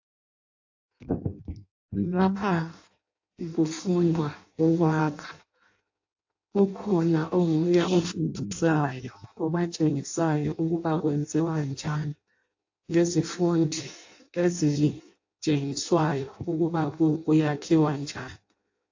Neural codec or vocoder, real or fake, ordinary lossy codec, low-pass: codec, 16 kHz in and 24 kHz out, 0.6 kbps, FireRedTTS-2 codec; fake; AAC, 48 kbps; 7.2 kHz